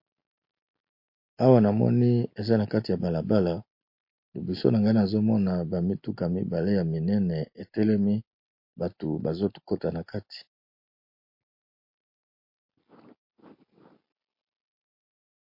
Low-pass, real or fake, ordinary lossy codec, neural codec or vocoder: 5.4 kHz; real; MP3, 32 kbps; none